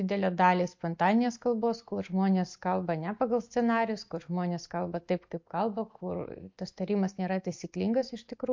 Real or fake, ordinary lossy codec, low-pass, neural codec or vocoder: real; MP3, 48 kbps; 7.2 kHz; none